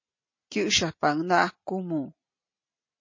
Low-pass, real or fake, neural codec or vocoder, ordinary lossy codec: 7.2 kHz; real; none; MP3, 32 kbps